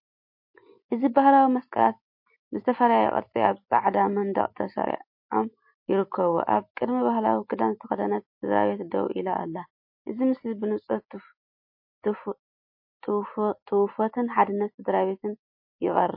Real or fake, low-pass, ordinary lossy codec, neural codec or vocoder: real; 5.4 kHz; MP3, 32 kbps; none